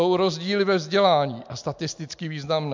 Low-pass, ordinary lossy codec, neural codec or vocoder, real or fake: 7.2 kHz; MP3, 64 kbps; none; real